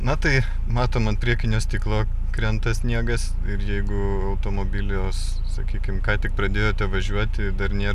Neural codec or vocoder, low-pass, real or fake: none; 14.4 kHz; real